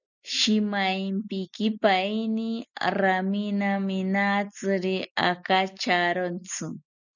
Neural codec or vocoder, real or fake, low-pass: none; real; 7.2 kHz